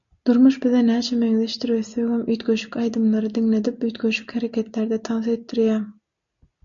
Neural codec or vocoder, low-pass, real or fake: none; 7.2 kHz; real